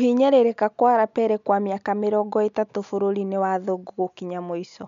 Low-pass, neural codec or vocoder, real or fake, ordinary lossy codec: 7.2 kHz; none; real; MP3, 64 kbps